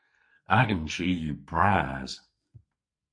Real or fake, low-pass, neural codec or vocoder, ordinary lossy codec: fake; 9.9 kHz; codec, 44.1 kHz, 2.6 kbps, SNAC; MP3, 48 kbps